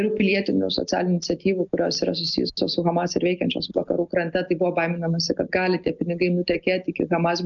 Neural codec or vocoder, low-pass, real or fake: none; 7.2 kHz; real